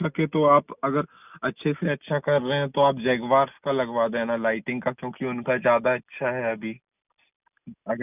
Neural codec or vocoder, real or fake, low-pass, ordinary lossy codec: none; real; 3.6 kHz; none